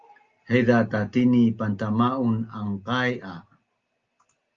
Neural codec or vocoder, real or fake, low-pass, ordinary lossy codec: none; real; 7.2 kHz; Opus, 32 kbps